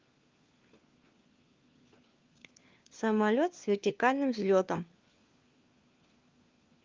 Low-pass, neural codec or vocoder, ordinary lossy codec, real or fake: 7.2 kHz; codec, 16 kHz, 4 kbps, FunCodec, trained on LibriTTS, 50 frames a second; Opus, 24 kbps; fake